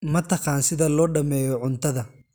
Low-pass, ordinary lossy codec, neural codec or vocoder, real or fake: none; none; none; real